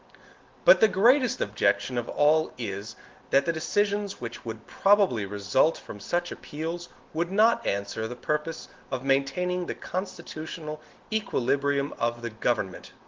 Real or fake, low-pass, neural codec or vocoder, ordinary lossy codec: real; 7.2 kHz; none; Opus, 16 kbps